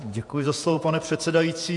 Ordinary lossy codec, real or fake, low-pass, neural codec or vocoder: MP3, 64 kbps; fake; 10.8 kHz; vocoder, 48 kHz, 128 mel bands, Vocos